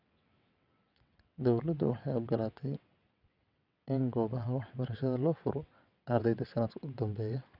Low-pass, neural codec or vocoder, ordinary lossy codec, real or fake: 5.4 kHz; vocoder, 22.05 kHz, 80 mel bands, WaveNeXt; none; fake